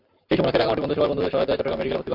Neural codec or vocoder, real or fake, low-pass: vocoder, 44.1 kHz, 128 mel bands every 512 samples, BigVGAN v2; fake; 5.4 kHz